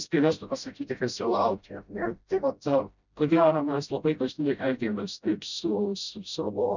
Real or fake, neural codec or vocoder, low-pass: fake; codec, 16 kHz, 0.5 kbps, FreqCodec, smaller model; 7.2 kHz